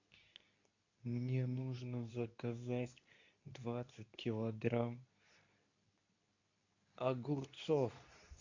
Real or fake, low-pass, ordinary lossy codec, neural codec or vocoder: fake; 7.2 kHz; AAC, 48 kbps; codec, 24 kHz, 0.9 kbps, WavTokenizer, medium speech release version 2